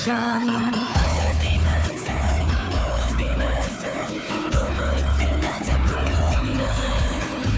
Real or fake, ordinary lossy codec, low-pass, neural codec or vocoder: fake; none; none; codec, 16 kHz, 4 kbps, FunCodec, trained on Chinese and English, 50 frames a second